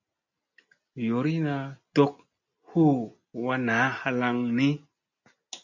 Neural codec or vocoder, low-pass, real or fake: none; 7.2 kHz; real